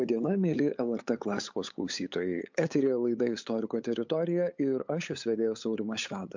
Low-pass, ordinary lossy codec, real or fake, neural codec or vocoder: 7.2 kHz; MP3, 64 kbps; fake; codec, 16 kHz, 8 kbps, FunCodec, trained on LibriTTS, 25 frames a second